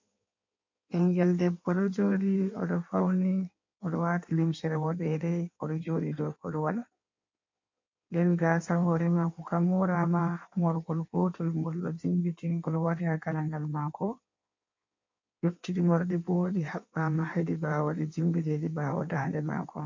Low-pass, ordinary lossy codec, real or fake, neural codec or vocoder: 7.2 kHz; MP3, 48 kbps; fake; codec, 16 kHz in and 24 kHz out, 1.1 kbps, FireRedTTS-2 codec